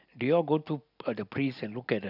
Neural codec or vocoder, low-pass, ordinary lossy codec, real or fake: none; 5.4 kHz; none; real